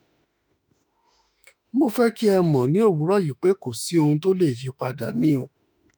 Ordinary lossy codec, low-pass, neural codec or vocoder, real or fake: none; none; autoencoder, 48 kHz, 32 numbers a frame, DAC-VAE, trained on Japanese speech; fake